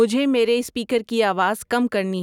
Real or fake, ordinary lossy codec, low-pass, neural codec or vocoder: real; none; 19.8 kHz; none